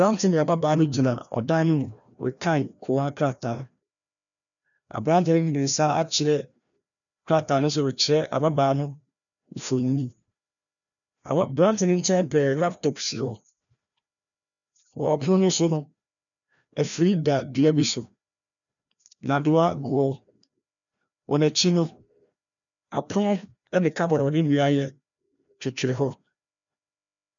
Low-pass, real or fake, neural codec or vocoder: 7.2 kHz; fake; codec, 16 kHz, 1 kbps, FreqCodec, larger model